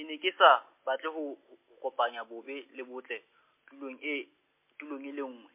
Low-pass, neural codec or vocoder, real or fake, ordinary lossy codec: 3.6 kHz; none; real; MP3, 24 kbps